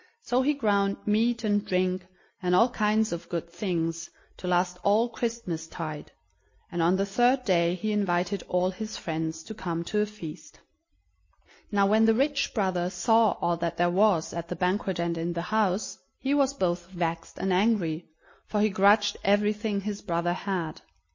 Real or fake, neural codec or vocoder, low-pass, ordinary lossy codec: real; none; 7.2 kHz; MP3, 32 kbps